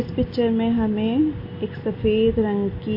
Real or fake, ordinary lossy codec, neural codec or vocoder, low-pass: real; MP3, 32 kbps; none; 5.4 kHz